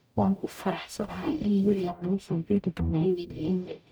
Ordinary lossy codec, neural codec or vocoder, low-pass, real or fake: none; codec, 44.1 kHz, 0.9 kbps, DAC; none; fake